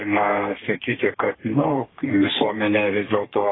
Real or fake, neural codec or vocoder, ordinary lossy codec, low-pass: fake; codec, 32 kHz, 1.9 kbps, SNAC; AAC, 16 kbps; 7.2 kHz